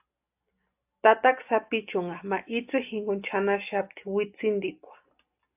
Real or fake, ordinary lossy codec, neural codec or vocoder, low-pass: real; AAC, 32 kbps; none; 3.6 kHz